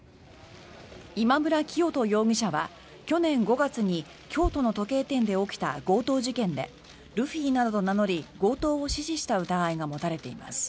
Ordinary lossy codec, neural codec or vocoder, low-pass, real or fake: none; none; none; real